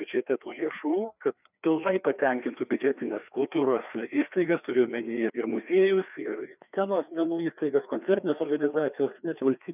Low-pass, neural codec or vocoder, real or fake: 3.6 kHz; codec, 16 kHz, 2 kbps, FreqCodec, larger model; fake